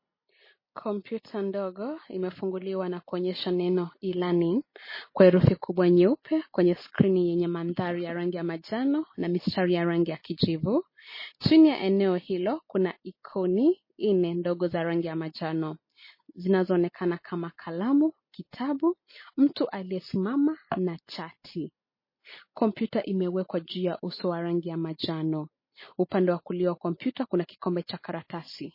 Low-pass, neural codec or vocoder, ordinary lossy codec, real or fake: 5.4 kHz; none; MP3, 24 kbps; real